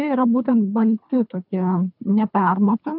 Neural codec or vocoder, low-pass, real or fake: codec, 24 kHz, 3 kbps, HILCodec; 5.4 kHz; fake